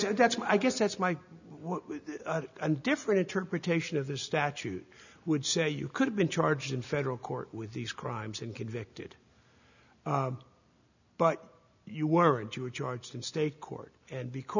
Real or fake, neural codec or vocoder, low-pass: real; none; 7.2 kHz